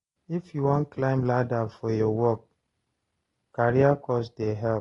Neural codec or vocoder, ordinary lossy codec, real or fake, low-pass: none; AAC, 32 kbps; real; 10.8 kHz